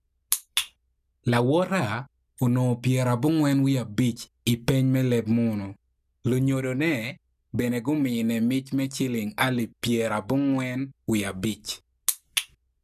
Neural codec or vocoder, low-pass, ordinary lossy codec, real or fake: none; 14.4 kHz; none; real